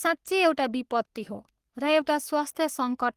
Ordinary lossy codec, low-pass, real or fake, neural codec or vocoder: Opus, 24 kbps; 14.4 kHz; fake; codec, 44.1 kHz, 3.4 kbps, Pupu-Codec